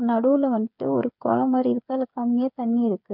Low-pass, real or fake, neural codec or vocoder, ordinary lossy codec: 5.4 kHz; fake; codec, 16 kHz, 8 kbps, FreqCodec, smaller model; MP3, 48 kbps